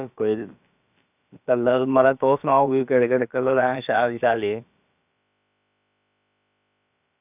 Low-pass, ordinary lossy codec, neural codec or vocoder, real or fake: 3.6 kHz; none; codec, 16 kHz, about 1 kbps, DyCAST, with the encoder's durations; fake